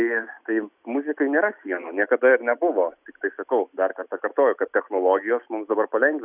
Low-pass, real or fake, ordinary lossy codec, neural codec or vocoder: 3.6 kHz; real; Opus, 64 kbps; none